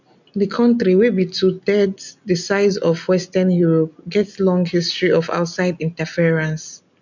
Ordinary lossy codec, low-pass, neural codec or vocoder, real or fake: none; 7.2 kHz; none; real